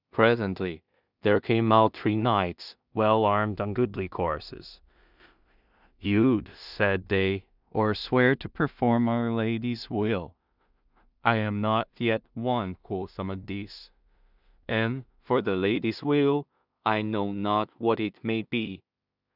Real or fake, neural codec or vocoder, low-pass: fake; codec, 16 kHz in and 24 kHz out, 0.4 kbps, LongCat-Audio-Codec, two codebook decoder; 5.4 kHz